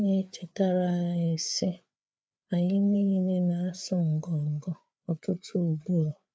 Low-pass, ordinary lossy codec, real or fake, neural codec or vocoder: none; none; fake; codec, 16 kHz, 4 kbps, FreqCodec, larger model